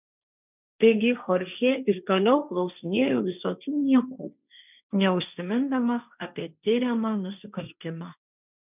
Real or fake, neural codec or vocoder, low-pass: fake; codec, 16 kHz, 1.1 kbps, Voila-Tokenizer; 3.6 kHz